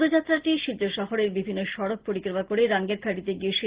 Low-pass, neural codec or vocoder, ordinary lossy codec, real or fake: 3.6 kHz; none; Opus, 16 kbps; real